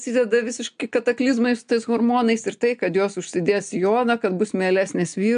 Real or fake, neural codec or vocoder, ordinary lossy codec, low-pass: real; none; MP3, 64 kbps; 9.9 kHz